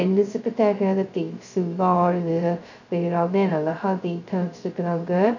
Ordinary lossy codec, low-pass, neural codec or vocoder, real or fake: none; 7.2 kHz; codec, 16 kHz, 0.2 kbps, FocalCodec; fake